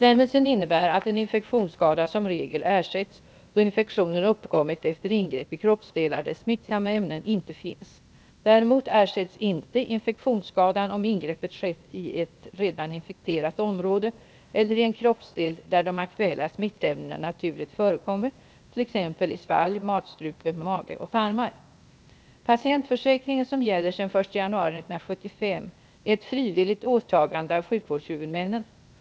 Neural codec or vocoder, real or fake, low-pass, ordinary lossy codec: codec, 16 kHz, 0.8 kbps, ZipCodec; fake; none; none